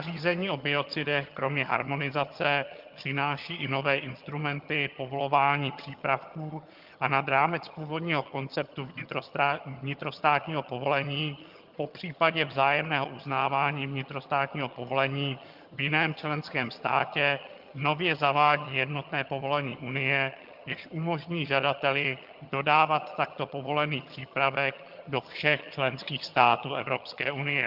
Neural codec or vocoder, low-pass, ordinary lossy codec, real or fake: vocoder, 22.05 kHz, 80 mel bands, HiFi-GAN; 5.4 kHz; Opus, 32 kbps; fake